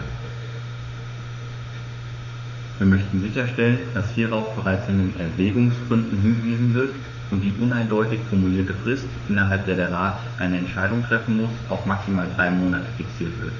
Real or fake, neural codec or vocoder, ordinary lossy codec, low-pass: fake; autoencoder, 48 kHz, 32 numbers a frame, DAC-VAE, trained on Japanese speech; none; 7.2 kHz